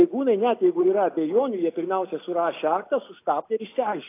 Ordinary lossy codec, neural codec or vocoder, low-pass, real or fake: AAC, 24 kbps; none; 3.6 kHz; real